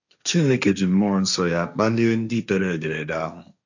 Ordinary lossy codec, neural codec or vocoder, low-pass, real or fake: none; codec, 16 kHz, 1.1 kbps, Voila-Tokenizer; 7.2 kHz; fake